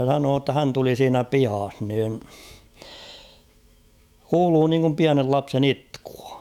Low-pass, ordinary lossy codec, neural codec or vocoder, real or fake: 19.8 kHz; none; autoencoder, 48 kHz, 128 numbers a frame, DAC-VAE, trained on Japanese speech; fake